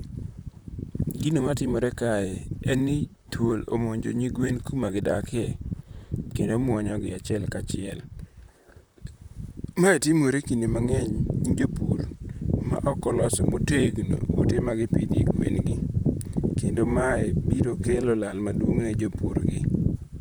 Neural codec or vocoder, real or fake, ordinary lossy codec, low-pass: vocoder, 44.1 kHz, 128 mel bands, Pupu-Vocoder; fake; none; none